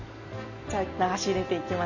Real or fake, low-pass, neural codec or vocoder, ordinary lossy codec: real; 7.2 kHz; none; none